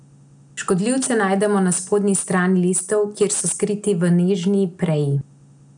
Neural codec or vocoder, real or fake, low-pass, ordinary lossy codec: none; real; 9.9 kHz; none